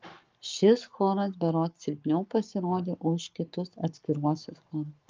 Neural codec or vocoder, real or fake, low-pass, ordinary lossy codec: codec, 44.1 kHz, 7.8 kbps, Pupu-Codec; fake; 7.2 kHz; Opus, 24 kbps